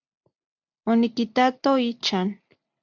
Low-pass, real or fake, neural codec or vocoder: 7.2 kHz; real; none